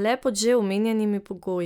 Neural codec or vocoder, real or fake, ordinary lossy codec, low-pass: none; real; none; 19.8 kHz